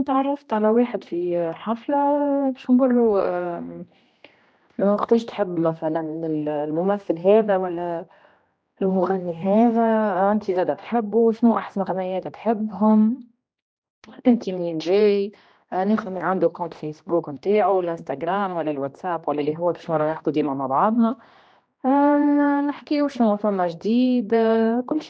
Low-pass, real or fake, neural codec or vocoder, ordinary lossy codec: none; fake; codec, 16 kHz, 1 kbps, X-Codec, HuBERT features, trained on general audio; none